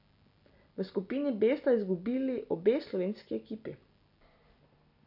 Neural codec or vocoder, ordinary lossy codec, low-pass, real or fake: none; none; 5.4 kHz; real